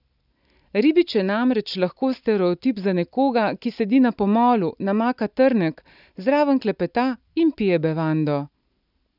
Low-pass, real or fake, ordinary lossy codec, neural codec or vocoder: 5.4 kHz; real; none; none